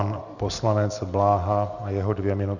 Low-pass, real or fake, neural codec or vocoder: 7.2 kHz; real; none